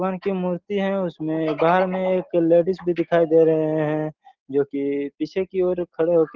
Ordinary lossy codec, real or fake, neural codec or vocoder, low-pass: Opus, 16 kbps; real; none; 7.2 kHz